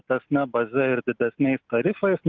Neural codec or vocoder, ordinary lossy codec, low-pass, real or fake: none; Opus, 24 kbps; 7.2 kHz; real